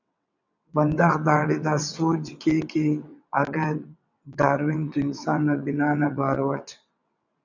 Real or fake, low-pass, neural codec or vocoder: fake; 7.2 kHz; vocoder, 22.05 kHz, 80 mel bands, WaveNeXt